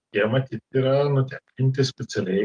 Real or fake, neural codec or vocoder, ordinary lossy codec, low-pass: real; none; Opus, 32 kbps; 9.9 kHz